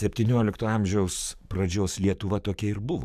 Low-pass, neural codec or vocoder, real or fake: 14.4 kHz; codec, 44.1 kHz, 7.8 kbps, DAC; fake